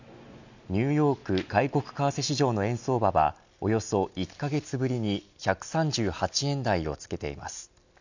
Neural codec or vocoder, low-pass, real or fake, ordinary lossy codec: none; 7.2 kHz; real; none